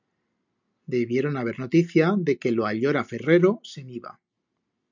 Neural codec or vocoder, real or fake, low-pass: none; real; 7.2 kHz